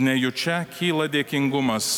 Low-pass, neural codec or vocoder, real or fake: 19.8 kHz; none; real